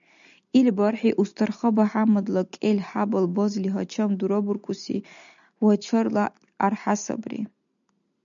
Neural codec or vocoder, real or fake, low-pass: none; real; 7.2 kHz